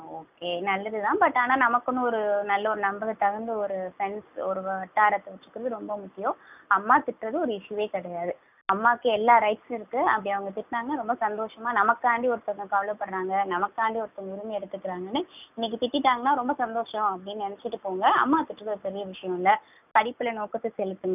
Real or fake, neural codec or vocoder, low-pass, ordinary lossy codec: real; none; 3.6 kHz; none